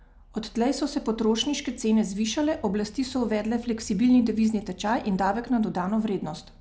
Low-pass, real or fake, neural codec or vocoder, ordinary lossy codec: none; real; none; none